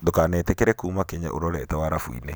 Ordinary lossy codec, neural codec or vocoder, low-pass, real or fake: none; none; none; real